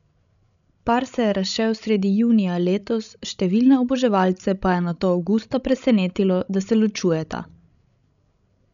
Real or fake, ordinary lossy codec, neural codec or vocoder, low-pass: fake; none; codec, 16 kHz, 16 kbps, FreqCodec, larger model; 7.2 kHz